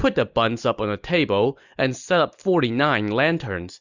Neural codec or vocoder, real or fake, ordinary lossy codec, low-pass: none; real; Opus, 64 kbps; 7.2 kHz